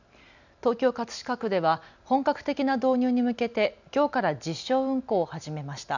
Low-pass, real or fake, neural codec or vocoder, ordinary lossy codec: 7.2 kHz; real; none; none